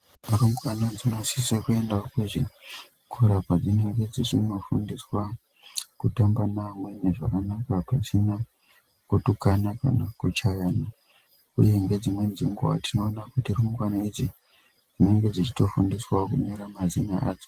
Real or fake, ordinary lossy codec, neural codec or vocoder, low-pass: fake; Opus, 24 kbps; vocoder, 44.1 kHz, 128 mel bands every 512 samples, BigVGAN v2; 14.4 kHz